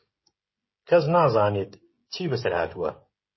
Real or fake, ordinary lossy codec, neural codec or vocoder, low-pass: fake; MP3, 24 kbps; codec, 16 kHz, 16 kbps, FreqCodec, smaller model; 7.2 kHz